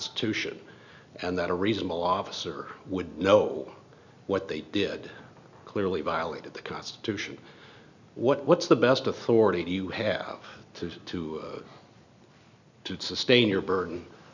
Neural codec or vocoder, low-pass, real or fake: none; 7.2 kHz; real